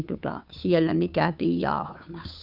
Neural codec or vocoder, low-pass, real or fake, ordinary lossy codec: codec, 16 kHz, 2 kbps, FunCodec, trained on Chinese and English, 25 frames a second; 5.4 kHz; fake; none